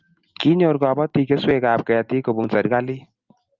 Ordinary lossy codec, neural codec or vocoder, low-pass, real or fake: Opus, 24 kbps; none; 7.2 kHz; real